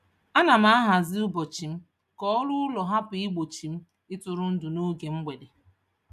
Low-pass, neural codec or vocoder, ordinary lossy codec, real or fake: 14.4 kHz; none; none; real